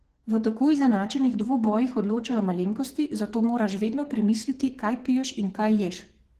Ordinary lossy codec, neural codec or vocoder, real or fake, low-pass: Opus, 16 kbps; codec, 44.1 kHz, 2.6 kbps, SNAC; fake; 14.4 kHz